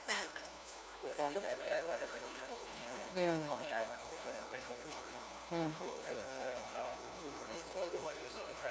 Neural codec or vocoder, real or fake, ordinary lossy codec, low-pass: codec, 16 kHz, 1 kbps, FunCodec, trained on LibriTTS, 50 frames a second; fake; none; none